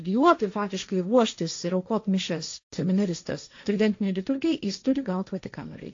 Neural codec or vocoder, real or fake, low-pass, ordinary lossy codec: codec, 16 kHz, 1.1 kbps, Voila-Tokenizer; fake; 7.2 kHz; AAC, 48 kbps